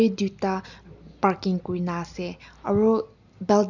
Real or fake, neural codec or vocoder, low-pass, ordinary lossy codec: real; none; 7.2 kHz; none